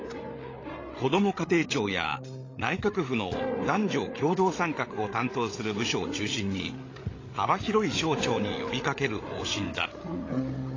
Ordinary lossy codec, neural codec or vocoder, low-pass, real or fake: AAC, 32 kbps; codec, 16 kHz, 8 kbps, FreqCodec, larger model; 7.2 kHz; fake